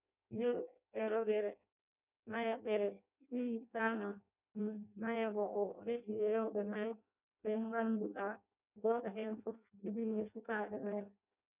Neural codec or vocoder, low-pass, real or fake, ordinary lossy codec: codec, 16 kHz in and 24 kHz out, 0.6 kbps, FireRedTTS-2 codec; 3.6 kHz; fake; none